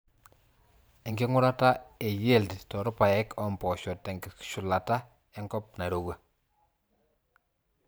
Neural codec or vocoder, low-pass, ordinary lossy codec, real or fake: vocoder, 44.1 kHz, 128 mel bands every 512 samples, BigVGAN v2; none; none; fake